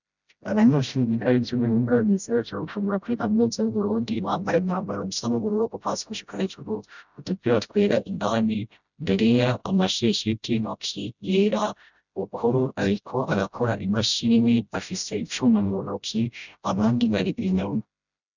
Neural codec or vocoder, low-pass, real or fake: codec, 16 kHz, 0.5 kbps, FreqCodec, smaller model; 7.2 kHz; fake